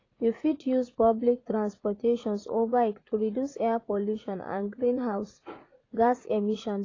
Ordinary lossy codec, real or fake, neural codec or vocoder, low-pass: AAC, 32 kbps; fake; vocoder, 44.1 kHz, 80 mel bands, Vocos; 7.2 kHz